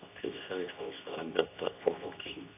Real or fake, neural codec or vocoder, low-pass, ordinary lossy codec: fake; codec, 24 kHz, 0.9 kbps, WavTokenizer, medium speech release version 2; 3.6 kHz; AAC, 24 kbps